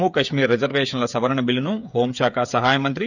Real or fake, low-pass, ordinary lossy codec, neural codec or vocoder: fake; 7.2 kHz; none; codec, 16 kHz, 8 kbps, FreqCodec, smaller model